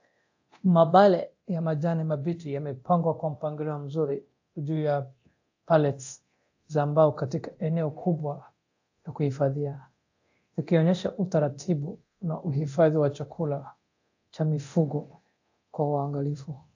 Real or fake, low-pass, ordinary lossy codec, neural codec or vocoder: fake; 7.2 kHz; AAC, 48 kbps; codec, 24 kHz, 0.9 kbps, DualCodec